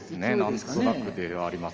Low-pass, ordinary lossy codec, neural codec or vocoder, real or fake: 7.2 kHz; Opus, 24 kbps; none; real